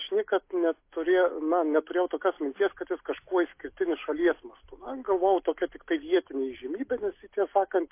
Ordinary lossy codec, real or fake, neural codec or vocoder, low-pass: MP3, 24 kbps; real; none; 3.6 kHz